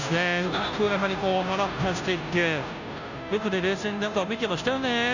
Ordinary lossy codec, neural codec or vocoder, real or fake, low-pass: none; codec, 16 kHz, 0.5 kbps, FunCodec, trained on Chinese and English, 25 frames a second; fake; 7.2 kHz